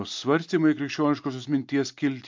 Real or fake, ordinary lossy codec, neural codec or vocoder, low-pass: real; MP3, 64 kbps; none; 7.2 kHz